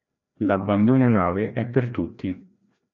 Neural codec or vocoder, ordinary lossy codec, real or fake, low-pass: codec, 16 kHz, 1 kbps, FreqCodec, larger model; MP3, 64 kbps; fake; 7.2 kHz